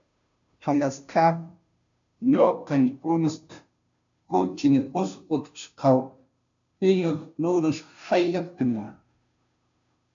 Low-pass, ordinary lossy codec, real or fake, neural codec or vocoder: 7.2 kHz; MP3, 64 kbps; fake; codec, 16 kHz, 0.5 kbps, FunCodec, trained on Chinese and English, 25 frames a second